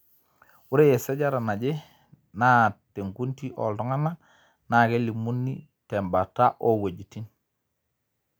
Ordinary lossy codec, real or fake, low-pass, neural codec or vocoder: none; real; none; none